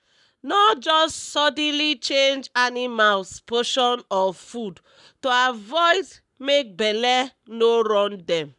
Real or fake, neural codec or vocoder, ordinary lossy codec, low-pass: fake; codec, 44.1 kHz, 7.8 kbps, Pupu-Codec; none; 10.8 kHz